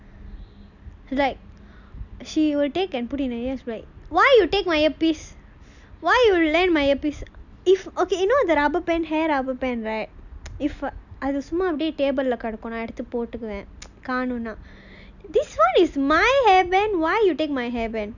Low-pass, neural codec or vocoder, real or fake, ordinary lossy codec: 7.2 kHz; none; real; none